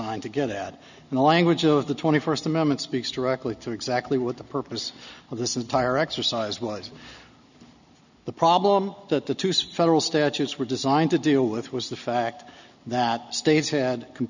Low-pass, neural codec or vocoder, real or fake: 7.2 kHz; none; real